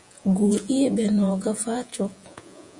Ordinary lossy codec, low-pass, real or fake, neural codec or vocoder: MP3, 96 kbps; 10.8 kHz; fake; vocoder, 48 kHz, 128 mel bands, Vocos